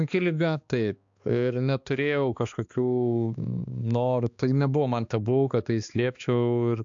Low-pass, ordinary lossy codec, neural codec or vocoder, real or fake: 7.2 kHz; AAC, 64 kbps; codec, 16 kHz, 2 kbps, X-Codec, HuBERT features, trained on balanced general audio; fake